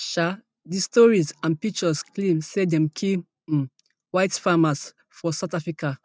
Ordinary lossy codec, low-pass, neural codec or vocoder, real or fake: none; none; none; real